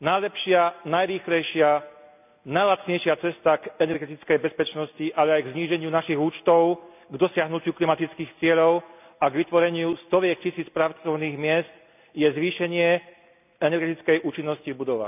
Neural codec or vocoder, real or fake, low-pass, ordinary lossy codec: none; real; 3.6 kHz; none